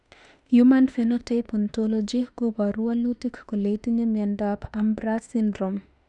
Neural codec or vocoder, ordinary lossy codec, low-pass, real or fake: autoencoder, 48 kHz, 32 numbers a frame, DAC-VAE, trained on Japanese speech; none; 10.8 kHz; fake